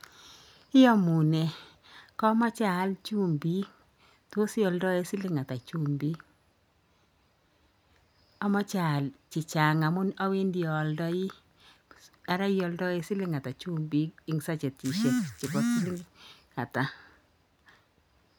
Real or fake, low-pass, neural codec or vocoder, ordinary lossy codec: real; none; none; none